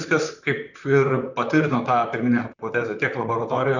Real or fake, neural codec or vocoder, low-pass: fake; vocoder, 44.1 kHz, 128 mel bands, Pupu-Vocoder; 7.2 kHz